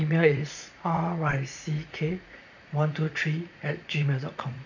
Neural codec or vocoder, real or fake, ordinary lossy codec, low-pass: vocoder, 44.1 kHz, 80 mel bands, Vocos; fake; none; 7.2 kHz